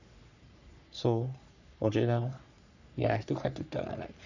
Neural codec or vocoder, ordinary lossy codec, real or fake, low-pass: codec, 44.1 kHz, 3.4 kbps, Pupu-Codec; none; fake; 7.2 kHz